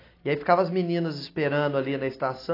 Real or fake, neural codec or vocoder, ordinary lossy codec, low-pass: real; none; AAC, 24 kbps; 5.4 kHz